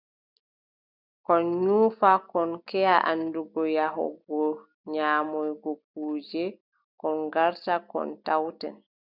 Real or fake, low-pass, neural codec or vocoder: real; 5.4 kHz; none